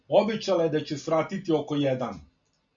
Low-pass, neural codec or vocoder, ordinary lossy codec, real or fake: 7.2 kHz; none; MP3, 64 kbps; real